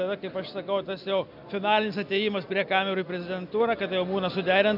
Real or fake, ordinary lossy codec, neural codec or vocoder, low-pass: real; AAC, 48 kbps; none; 5.4 kHz